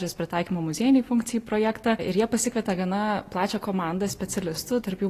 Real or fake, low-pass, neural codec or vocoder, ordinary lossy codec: real; 14.4 kHz; none; AAC, 48 kbps